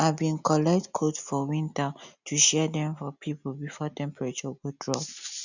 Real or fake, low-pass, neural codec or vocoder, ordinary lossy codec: real; 7.2 kHz; none; none